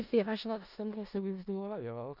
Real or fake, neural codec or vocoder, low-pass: fake; codec, 16 kHz in and 24 kHz out, 0.4 kbps, LongCat-Audio-Codec, four codebook decoder; 5.4 kHz